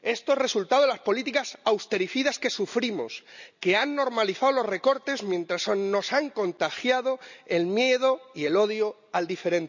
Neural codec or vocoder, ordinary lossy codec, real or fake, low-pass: none; none; real; 7.2 kHz